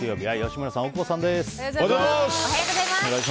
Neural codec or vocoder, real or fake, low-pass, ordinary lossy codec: none; real; none; none